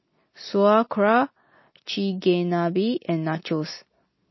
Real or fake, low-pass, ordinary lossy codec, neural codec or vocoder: real; 7.2 kHz; MP3, 24 kbps; none